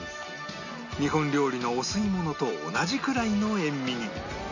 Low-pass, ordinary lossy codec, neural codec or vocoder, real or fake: 7.2 kHz; none; none; real